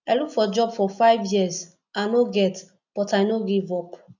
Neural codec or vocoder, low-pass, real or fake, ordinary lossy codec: none; 7.2 kHz; real; none